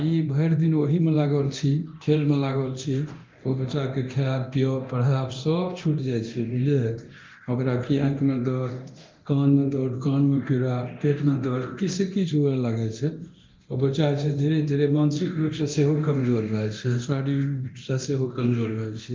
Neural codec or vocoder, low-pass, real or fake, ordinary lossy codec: codec, 24 kHz, 0.9 kbps, DualCodec; 7.2 kHz; fake; Opus, 32 kbps